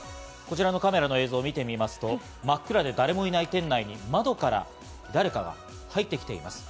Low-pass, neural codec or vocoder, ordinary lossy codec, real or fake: none; none; none; real